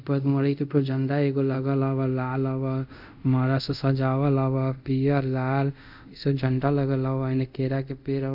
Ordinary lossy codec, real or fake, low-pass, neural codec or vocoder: none; fake; 5.4 kHz; codec, 24 kHz, 0.5 kbps, DualCodec